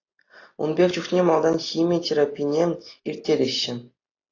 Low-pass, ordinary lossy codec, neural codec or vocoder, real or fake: 7.2 kHz; AAC, 32 kbps; none; real